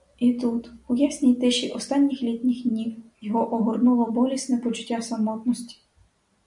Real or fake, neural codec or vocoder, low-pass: real; none; 10.8 kHz